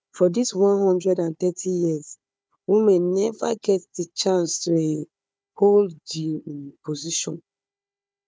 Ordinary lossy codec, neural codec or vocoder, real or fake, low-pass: none; codec, 16 kHz, 4 kbps, FunCodec, trained on Chinese and English, 50 frames a second; fake; none